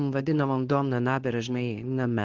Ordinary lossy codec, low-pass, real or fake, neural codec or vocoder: Opus, 16 kbps; 7.2 kHz; fake; codec, 24 kHz, 0.9 kbps, WavTokenizer, medium speech release version 2